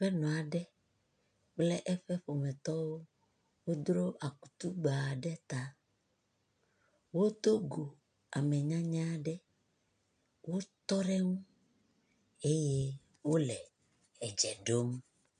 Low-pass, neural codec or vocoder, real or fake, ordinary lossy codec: 9.9 kHz; none; real; MP3, 96 kbps